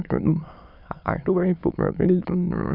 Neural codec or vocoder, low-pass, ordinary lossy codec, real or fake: autoencoder, 22.05 kHz, a latent of 192 numbers a frame, VITS, trained on many speakers; 5.4 kHz; none; fake